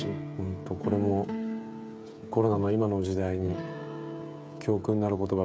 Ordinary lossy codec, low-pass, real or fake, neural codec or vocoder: none; none; fake; codec, 16 kHz, 16 kbps, FreqCodec, smaller model